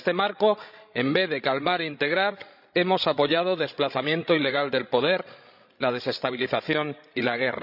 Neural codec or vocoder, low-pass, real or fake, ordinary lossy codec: codec, 16 kHz, 16 kbps, FreqCodec, larger model; 5.4 kHz; fake; none